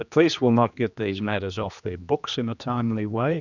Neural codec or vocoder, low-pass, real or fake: codec, 16 kHz, 2 kbps, X-Codec, HuBERT features, trained on general audio; 7.2 kHz; fake